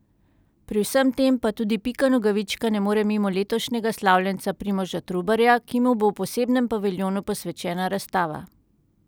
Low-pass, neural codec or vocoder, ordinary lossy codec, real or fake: none; none; none; real